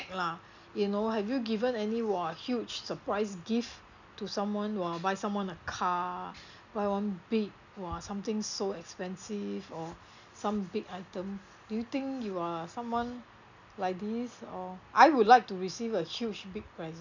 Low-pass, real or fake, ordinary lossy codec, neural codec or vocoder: 7.2 kHz; real; none; none